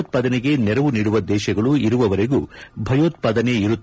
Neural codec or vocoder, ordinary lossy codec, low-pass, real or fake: none; none; none; real